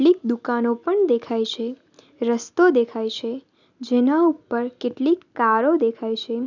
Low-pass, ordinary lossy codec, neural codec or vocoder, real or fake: 7.2 kHz; none; none; real